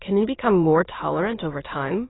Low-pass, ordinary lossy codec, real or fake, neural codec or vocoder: 7.2 kHz; AAC, 16 kbps; fake; autoencoder, 22.05 kHz, a latent of 192 numbers a frame, VITS, trained on many speakers